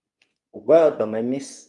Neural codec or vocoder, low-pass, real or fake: codec, 24 kHz, 0.9 kbps, WavTokenizer, medium speech release version 2; 10.8 kHz; fake